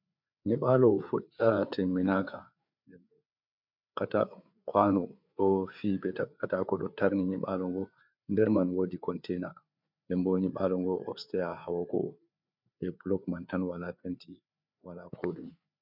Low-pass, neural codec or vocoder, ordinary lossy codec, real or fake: 5.4 kHz; codec, 16 kHz, 4 kbps, FreqCodec, larger model; MP3, 48 kbps; fake